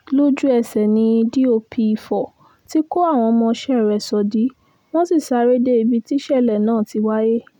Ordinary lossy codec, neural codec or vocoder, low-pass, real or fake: none; none; 19.8 kHz; real